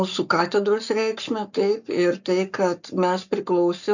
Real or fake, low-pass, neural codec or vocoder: fake; 7.2 kHz; codec, 44.1 kHz, 7.8 kbps, Pupu-Codec